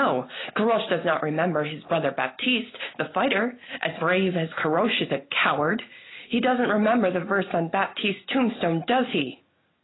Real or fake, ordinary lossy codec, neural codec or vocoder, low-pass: real; AAC, 16 kbps; none; 7.2 kHz